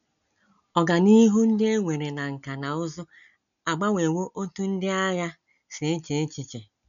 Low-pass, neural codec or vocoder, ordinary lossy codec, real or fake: 7.2 kHz; none; none; real